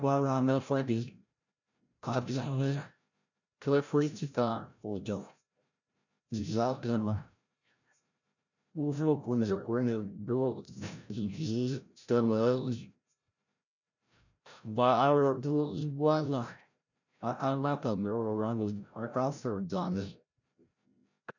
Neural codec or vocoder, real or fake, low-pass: codec, 16 kHz, 0.5 kbps, FreqCodec, larger model; fake; 7.2 kHz